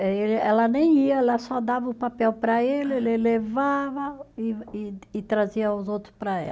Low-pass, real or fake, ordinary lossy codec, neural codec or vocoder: none; real; none; none